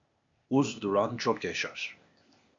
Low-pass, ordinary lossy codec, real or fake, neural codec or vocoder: 7.2 kHz; MP3, 64 kbps; fake; codec, 16 kHz, 0.8 kbps, ZipCodec